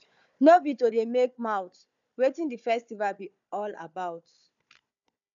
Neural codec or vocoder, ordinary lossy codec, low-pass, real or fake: codec, 16 kHz, 4 kbps, FunCodec, trained on Chinese and English, 50 frames a second; none; 7.2 kHz; fake